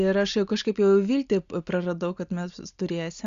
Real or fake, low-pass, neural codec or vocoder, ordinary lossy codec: real; 7.2 kHz; none; Opus, 64 kbps